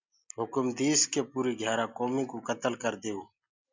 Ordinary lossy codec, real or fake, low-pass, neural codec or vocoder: MP3, 64 kbps; real; 7.2 kHz; none